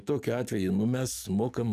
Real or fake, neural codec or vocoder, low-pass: fake; codec, 44.1 kHz, 7.8 kbps, DAC; 14.4 kHz